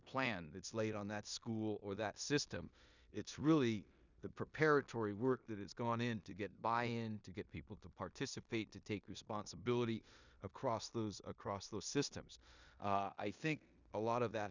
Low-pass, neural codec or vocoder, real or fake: 7.2 kHz; codec, 16 kHz in and 24 kHz out, 0.9 kbps, LongCat-Audio-Codec, four codebook decoder; fake